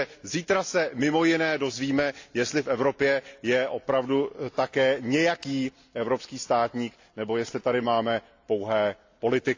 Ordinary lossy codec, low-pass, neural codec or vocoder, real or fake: AAC, 48 kbps; 7.2 kHz; none; real